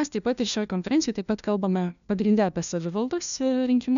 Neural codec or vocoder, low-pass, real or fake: codec, 16 kHz, 1 kbps, FunCodec, trained on LibriTTS, 50 frames a second; 7.2 kHz; fake